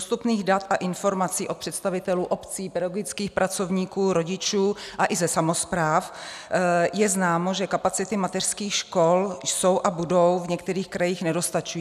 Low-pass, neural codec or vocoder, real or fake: 14.4 kHz; none; real